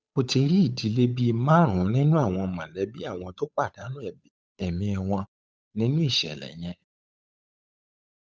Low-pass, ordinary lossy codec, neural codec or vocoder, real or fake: none; none; codec, 16 kHz, 8 kbps, FunCodec, trained on Chinese and English, 25 frames a second; fake